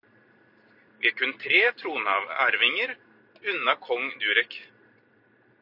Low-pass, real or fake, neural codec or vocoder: 5.4 kHz; real; none